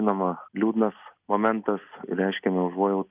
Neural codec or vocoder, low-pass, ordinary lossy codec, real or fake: none; 3.6 kHz; Opus, 32 kbps; real